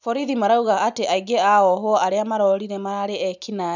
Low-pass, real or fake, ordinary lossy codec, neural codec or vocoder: 7.2 kHz; real; none; none